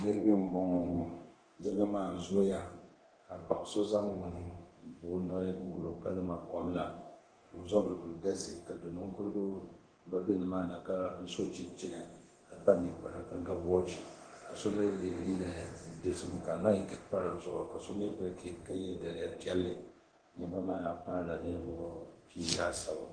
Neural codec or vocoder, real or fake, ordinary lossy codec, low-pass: codec, 24 kHz, 0.9 kbps, DualCodec; fake; Opus, 16 kbps; 9.9 kHz